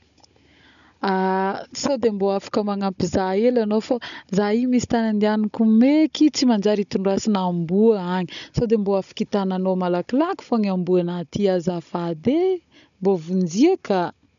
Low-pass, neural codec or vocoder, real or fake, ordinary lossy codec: 7.2 kHz; codec, 16 kHz, 16 kbps, FunCodec, trained on Chinese and English, 50 frames a second; fake; MP3, 96 kbps